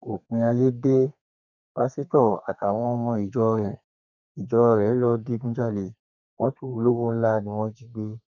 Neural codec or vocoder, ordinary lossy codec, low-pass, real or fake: codec, 32 kHz, 1.9 kbps, SNAC; none; 7.2 kHz; fake